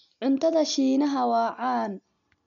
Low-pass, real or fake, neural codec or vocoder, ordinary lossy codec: 7.2 kHz; real; none; MP3, 96 kbps